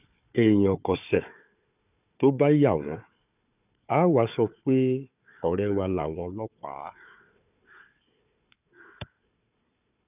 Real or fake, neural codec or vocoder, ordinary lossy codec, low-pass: fake; codec, 16 kHz, 4 kbps, FunCodec, trained on Chinese and English, 50 frames a second; none; 3.6 kHz